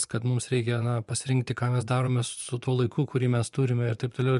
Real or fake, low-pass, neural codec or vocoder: fake; 10.8 kHz; vocoder, 24 kHz, 100 mel bands, Vocos